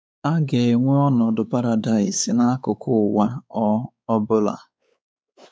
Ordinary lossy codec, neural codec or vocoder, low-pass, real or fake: none; codec, 16 kHz, 4 kbps, X-Codec, WavLM features, trained on Multilingual LibriSpeech; none; fake